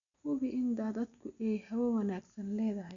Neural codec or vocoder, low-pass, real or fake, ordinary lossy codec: none; 7.2 kHz; real; none